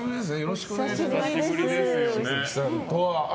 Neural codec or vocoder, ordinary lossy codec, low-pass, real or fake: none; none; none; real